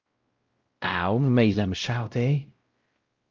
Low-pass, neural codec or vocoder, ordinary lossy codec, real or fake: 7.2 kHz; codec, 16 kHz, 0.5 kbps, X-Codec, WavLM features, trained on Multilingual LibriSpeech; Opus, 24 kbps; fake